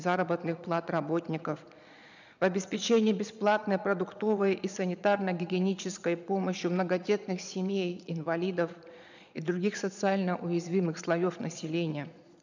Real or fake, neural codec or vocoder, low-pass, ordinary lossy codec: fake; vocoder, 22.05 kHz, 80 mel bands, Vocos; 7.2 kHz; none